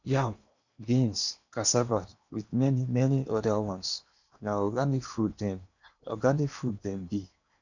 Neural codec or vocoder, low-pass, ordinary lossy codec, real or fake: codec, 16 kHz in and 24 kHz out, 0.8 kbps, FocalCodec, streaming, 65536 codes; 7.2 kHz; none; fake